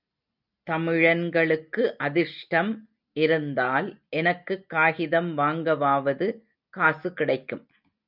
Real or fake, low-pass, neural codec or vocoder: real; 5.4 kHz; none